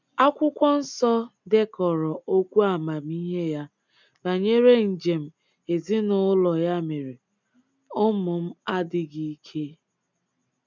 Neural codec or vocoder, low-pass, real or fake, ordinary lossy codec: none; 7.2 kHz; real; none